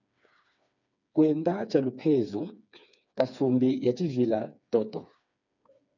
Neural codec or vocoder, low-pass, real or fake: codec, 16 kHz, 4 kbps, FreqCodec, smaller model; 7.2 kHz; fake